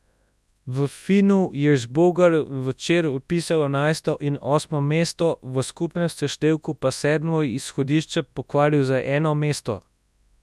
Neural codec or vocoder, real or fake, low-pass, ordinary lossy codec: codec, 24 kHz, 0.9 kbps, WavTokenizer, large speech release; fake; none; none